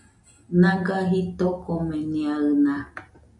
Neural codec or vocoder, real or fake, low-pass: none; real; 10.8 kHz